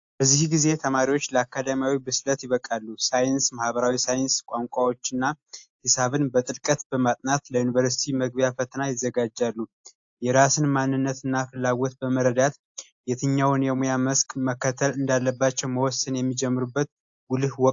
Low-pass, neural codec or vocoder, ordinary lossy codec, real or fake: 7.2 kHz; none; AAC, 64 kbps; real